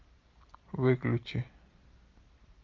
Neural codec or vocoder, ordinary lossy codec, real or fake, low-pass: none; Opus, 24 kbps; real; 7.2 kHz